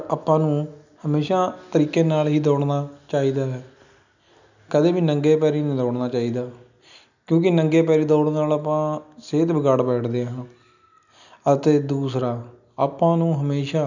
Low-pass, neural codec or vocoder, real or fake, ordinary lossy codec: 7.2 kHz; none; real; none